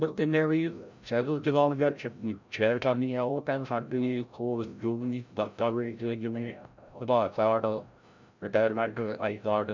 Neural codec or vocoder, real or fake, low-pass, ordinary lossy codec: codec, 16 kHz, 0.5 kbps, FreqCodec, larger model; fake; 7.2 kHz; MP3, 64 kbps